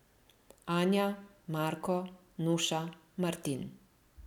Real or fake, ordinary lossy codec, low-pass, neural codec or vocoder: real; none; 19.8 kHz; none